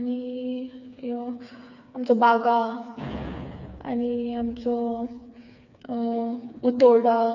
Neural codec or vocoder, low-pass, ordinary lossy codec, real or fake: codec, 16 kHz, 4 kbps, FreqCodec, smaller model; 7.2 kHz; none; fake